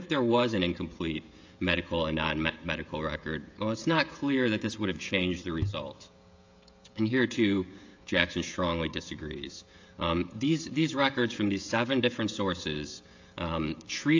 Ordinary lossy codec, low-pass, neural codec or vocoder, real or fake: AAC, 48 kbps; 7.2 kHz; codec, 16 kHz, 16 kbps, FreqCodec, smaller model; fake